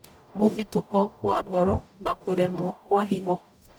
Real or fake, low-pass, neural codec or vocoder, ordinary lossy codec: fake; none; codec, 44.1 kHz, 0.9 kbps, DAC; none